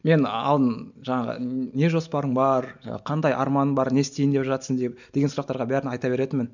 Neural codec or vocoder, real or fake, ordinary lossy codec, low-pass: none; real; none; 7.2 kHz